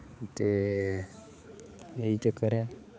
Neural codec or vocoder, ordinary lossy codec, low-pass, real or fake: codec, 16 kHz, 4 kbps, X-Codec, HuBERT features, trained on balanced general audio; none; none; fake